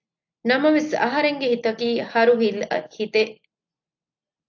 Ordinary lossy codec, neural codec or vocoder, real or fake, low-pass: AAC, 48 kbps; none; real; 7.2 kHz